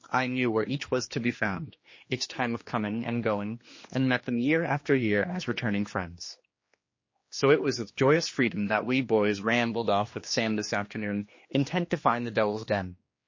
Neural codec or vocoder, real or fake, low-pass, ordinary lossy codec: codec, 16 kHz, 2 kbps, X-Codec, HuBERT features, trained on general audio; fake; 7.2 kHz; MP3, 32 kbps